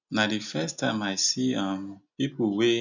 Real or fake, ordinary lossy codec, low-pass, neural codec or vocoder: real; none; 7.2 kHz; none